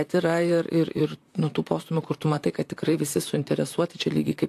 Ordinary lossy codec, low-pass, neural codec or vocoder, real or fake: AAC, 64 kbps; 14.4 kHz; vocoder, 44.1 kHz, 128 mel bands, Pupu-Vocoder; fake